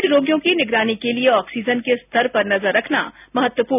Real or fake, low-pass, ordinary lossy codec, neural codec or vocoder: real; 3.6 kHz; none; none